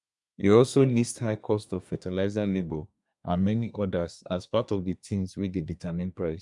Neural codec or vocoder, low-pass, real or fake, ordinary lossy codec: codec, 24 kHz, 1 kbps, SNAC; 10.8 kHz; fake; none